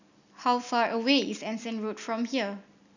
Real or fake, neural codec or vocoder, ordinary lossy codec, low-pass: real; none; none; 7.2 kHz